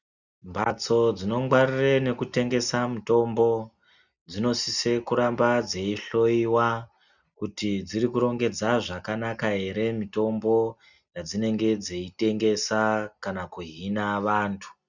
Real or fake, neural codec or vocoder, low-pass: real; none; 7.2 kHz